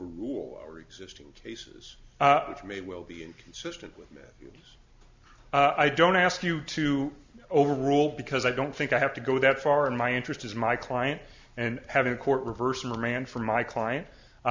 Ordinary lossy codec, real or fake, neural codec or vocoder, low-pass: MP3, 64 kbps; real; none; 7.2 kHz